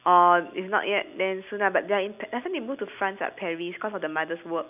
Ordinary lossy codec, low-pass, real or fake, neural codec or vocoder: none; 3.6 kHz; real; none